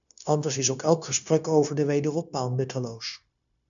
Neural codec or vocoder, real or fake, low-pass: codec, 16 kHz, 0.9 kbps, LongCat-Audio-Codec; fake; 7.2 kHz